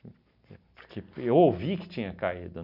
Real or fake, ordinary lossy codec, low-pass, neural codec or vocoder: real; none; 5.4 kHz; none